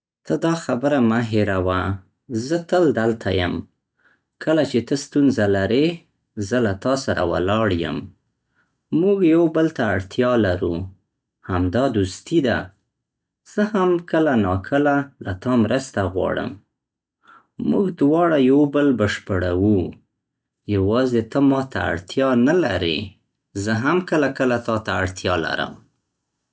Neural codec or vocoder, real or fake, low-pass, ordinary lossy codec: none; real; none; none